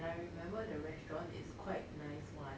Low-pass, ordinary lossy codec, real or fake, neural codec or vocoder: none; none; real; none